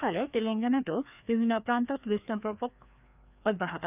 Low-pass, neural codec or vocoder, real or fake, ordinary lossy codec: 3.6 kHz; codec, 16 kHz, 2 kbps, FreqCodec, larger model; fake; none